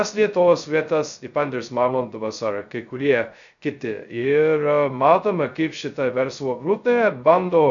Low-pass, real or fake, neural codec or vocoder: 7.2 kHz; fake; codec, 16 kHz, 0.2 kbps, FocalCodec